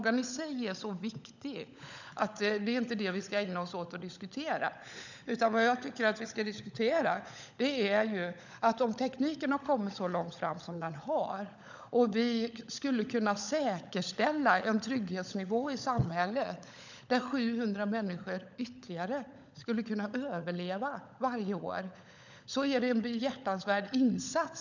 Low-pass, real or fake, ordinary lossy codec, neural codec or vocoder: 7.2 kHz; fake; none; codec, 16 kHz, 16 kbps, FunCodec, trained on LibriTTS, 50 frames a second